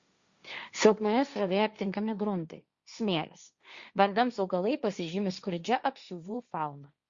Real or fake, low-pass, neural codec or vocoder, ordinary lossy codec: fake; 7.2 kHz; codec, 16 kHz, 1.1 kbps, Voila-Tokenizer; Opus, 64 kbps